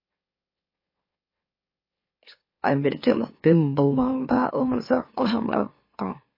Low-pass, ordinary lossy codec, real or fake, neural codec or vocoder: 5.4 kHz; MP3, 24 kbps; fake; autoencoder, 44.1 kHz, a latent of 192 numbers a frame, MeloTTS